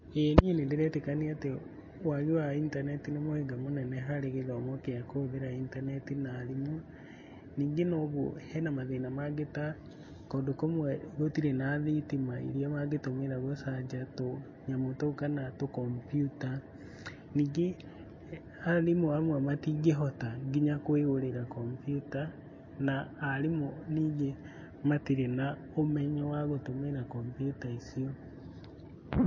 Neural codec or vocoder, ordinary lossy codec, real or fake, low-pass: none; MP3, 32 kbps; real; 7.2 kHz